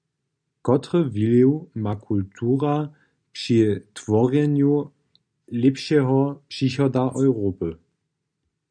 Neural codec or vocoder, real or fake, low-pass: none; real; 9.9 kHz